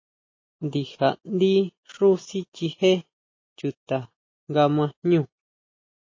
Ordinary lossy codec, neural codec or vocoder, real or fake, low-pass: MP3, 32 kbps; none; real; 7.2 kHz